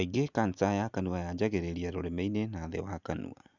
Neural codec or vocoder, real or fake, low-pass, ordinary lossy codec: none; real; 7.2 kHz; none